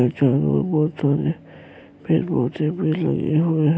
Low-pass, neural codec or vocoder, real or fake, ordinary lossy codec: none; none; real; none